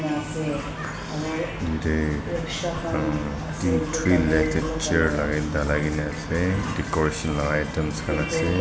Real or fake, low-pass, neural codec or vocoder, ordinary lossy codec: real; none; none; none